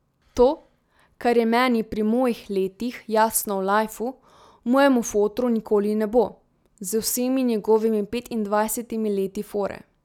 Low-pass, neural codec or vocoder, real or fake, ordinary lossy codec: 19.8 kHz; none; real; none